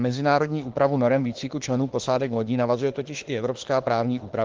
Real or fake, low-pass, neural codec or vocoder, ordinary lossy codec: fake; 7.2 kHz; autoencoder, 48 kHz, 32 numbers a frame, DAC-VAE, trained on Japanese speech; Opus, 16 kbps